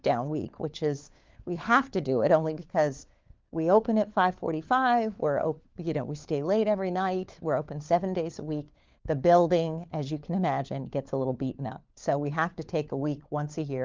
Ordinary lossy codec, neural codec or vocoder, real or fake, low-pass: Opus, 32 kbps; codec, 16 kHz, 4 kbps, FunCodec, trained on LibriTTS, 50 frames a second; fake; 7.2 kHz